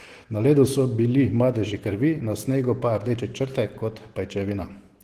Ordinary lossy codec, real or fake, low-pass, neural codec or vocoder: Opus, 16 kbps; fake; 14.4 kHz; autoencoder, 48 kHz, 128 numbers a frame, DAC-VAE, trained on Japanese speech